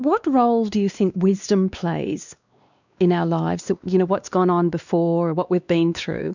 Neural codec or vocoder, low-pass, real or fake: codec, 16 kHz, 2 kbps, X-Codec, WavLM features, trained on Multilingual LibriSpeech; 7.2 kHz; fake